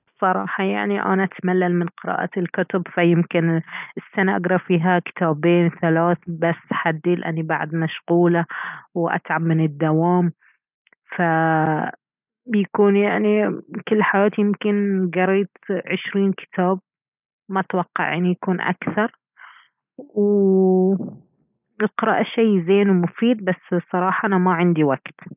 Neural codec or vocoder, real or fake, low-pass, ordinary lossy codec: none; real; 3.6 kHz; none